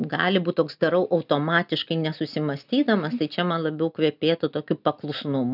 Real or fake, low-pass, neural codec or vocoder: real; 5.4 kHz; none